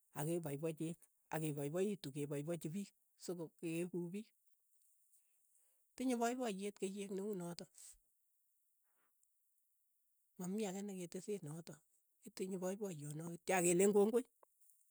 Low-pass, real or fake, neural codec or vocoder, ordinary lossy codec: none; real; none; none